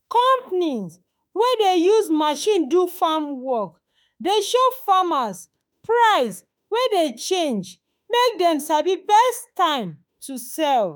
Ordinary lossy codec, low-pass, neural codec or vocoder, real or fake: none; none; autoencoder, 48 kHz, 32 numbers a frame, DAC-VAE, trained on Japanese speech; fake